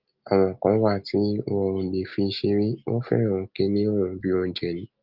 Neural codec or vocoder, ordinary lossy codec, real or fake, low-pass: none; Opus, 24 kbps; real; 5.4 kHz